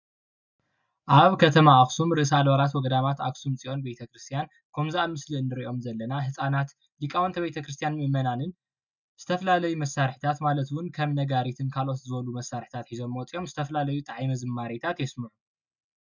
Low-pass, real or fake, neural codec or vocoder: 7.2 kHz; real; none